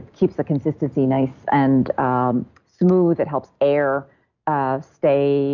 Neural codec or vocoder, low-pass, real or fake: none; 7.2 kHz; real